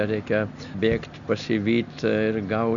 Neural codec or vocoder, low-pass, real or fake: none; 7.2 kHz; real